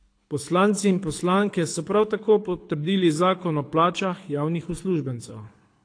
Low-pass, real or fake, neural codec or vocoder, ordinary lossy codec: 9.9 kHz; fake; codec, 24 kHz, 6 kbps, HILCodec; AAC, 48 kbps